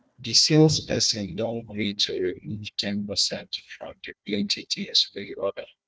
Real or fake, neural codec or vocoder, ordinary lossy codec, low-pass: fake; codec, 16 kHz, 1 kbps, FunCodec, trained on Chinese and English, 50 frames a second; none; none